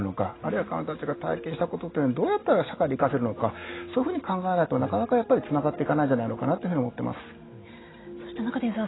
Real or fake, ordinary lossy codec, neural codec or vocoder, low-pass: real; AAC, 16 kbps; none; 7.2 kHz